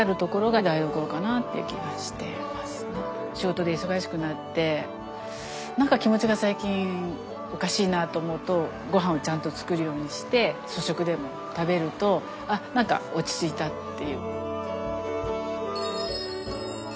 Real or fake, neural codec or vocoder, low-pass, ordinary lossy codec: real; none; none; none